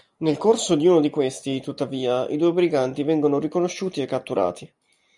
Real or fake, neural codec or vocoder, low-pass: real; none; 10.8 kHz